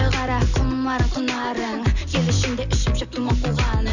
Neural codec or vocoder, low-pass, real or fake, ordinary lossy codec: none; 7.2 kHz; real; none